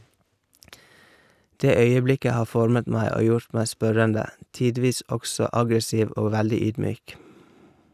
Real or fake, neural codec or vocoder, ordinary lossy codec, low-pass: real; none; none; 14.4 kHz